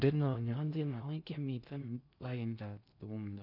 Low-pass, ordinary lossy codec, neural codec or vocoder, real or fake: 5.4 kHz; none; codec, 16 kHz in and 24 kHz out, 0.6 kbps, FocalCodec, streaming, 2048 codes; fake